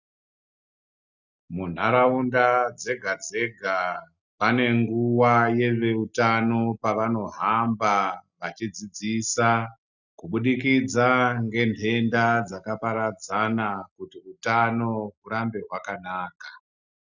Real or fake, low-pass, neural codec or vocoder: real; 7.2 kHz; none